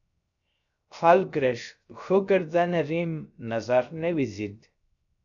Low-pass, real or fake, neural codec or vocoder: 7.2 kHz; fake; codec, 16 kHz, 0.3 kbps, FocalCodec